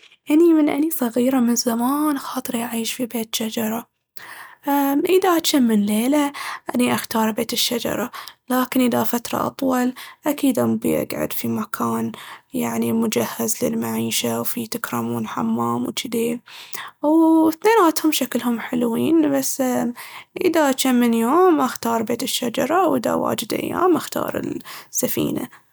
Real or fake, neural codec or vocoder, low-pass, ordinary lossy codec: real; none; none; none